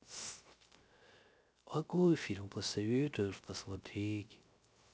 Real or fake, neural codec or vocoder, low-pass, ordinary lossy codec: fake; codec, 16 kHz, 0.3 kbps, FocalCodec; none; none